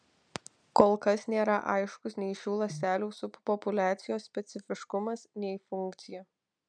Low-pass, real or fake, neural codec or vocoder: 9.9 kHz; real; none